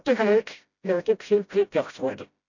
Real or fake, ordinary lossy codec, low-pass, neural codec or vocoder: fake; none; 7.2 kHz; codec, 16 kHz, 0.5 kbps, FreqCodec, smaller model